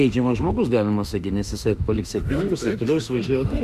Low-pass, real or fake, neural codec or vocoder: 14.4 kHz; fake; autoencoder, 48 kHz, 32 numbers a frame, DAC-VAE, trained on Japanese speech